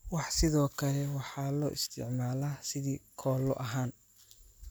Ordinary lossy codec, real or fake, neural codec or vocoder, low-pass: none; real; none; none